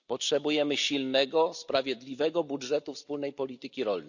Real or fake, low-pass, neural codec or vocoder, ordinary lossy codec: real; 7.2 kHz; none; none